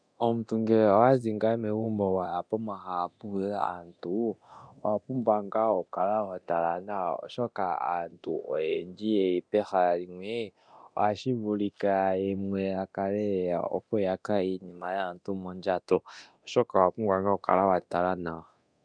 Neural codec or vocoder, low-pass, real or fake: codec, 24 kHz, 0.9 kbps, DualCodec; 9.9 kHz; fake